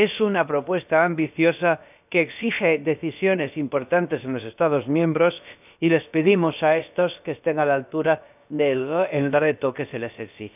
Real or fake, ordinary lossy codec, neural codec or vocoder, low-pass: fake; none; codec, 16 kHz, about 1 kbps, DyCAST, with the encoder's durations; 3.6 kHz